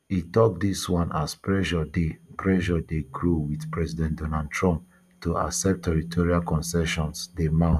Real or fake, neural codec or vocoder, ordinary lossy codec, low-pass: real; none; none; 14.4 kHz